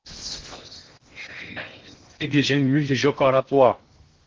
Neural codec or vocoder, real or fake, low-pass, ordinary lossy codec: codec, 16 kHz in and 24 kHz out, 0.6 kbps, FocalCodec, streaming, 4096 codes; fake; 7.2 kHz; Opus, 16 kbps